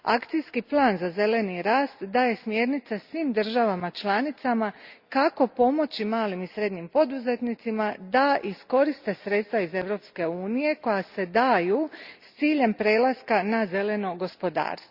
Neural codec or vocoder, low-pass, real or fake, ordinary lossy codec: none; 5.4 kHz; real; Opus, 64 kbps